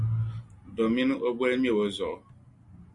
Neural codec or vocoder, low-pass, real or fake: none; 10.8 kHz; real